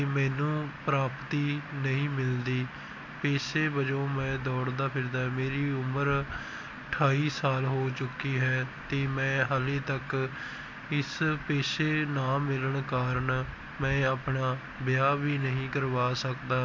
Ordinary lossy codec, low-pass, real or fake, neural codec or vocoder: MP3, 48 kbps; 7.2 kHz; real; none